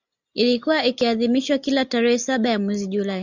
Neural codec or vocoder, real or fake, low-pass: none; real; 7.2 kHz